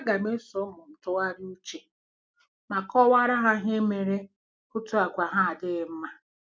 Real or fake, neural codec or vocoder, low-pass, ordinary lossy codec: real; none; none; none